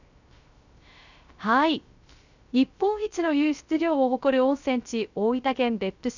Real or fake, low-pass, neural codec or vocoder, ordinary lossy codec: fake; 7.2 kHz; codec, 16 kHz, 0.3 kbps, FocalCodec; none